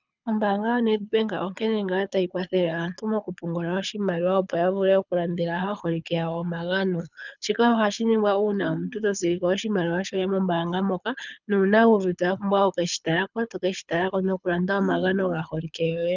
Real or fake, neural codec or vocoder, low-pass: fake; codec, 24 kHz, 6 kbps, HILCodec; 7.2 kHz